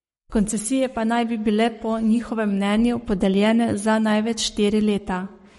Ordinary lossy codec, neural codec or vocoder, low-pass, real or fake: MP3, 48 kbps; codec, 44.1 kHz, 7.8 kbps, Pupu-Codec; 19.8 kHz; fake